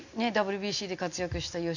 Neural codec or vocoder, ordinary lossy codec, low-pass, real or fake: none; none; 7.2 kHz; real